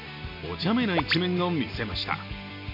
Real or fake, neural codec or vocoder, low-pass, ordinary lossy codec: real; none; 5.4 kHz; MP3, 48 kbps